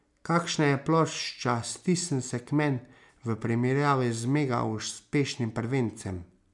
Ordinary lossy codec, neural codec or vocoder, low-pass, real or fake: MP3, 96 kbps; none; 10.8 kHz; real